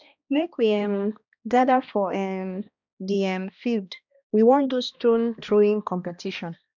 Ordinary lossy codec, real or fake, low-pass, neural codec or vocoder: none; fake; 7.2 kHz; codec, 16 kHz, 1 kbps, X-Codec, HuBERT features, trained on balanced general audio